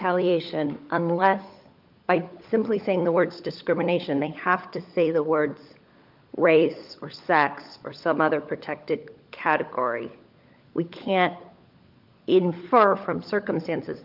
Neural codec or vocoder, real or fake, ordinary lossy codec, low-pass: codec, 16 kHz, 16 kbps, FunCodec, trained on LibriTTS, 50 frames a second; fake; Opus, 24 kbps; 5.4 kHz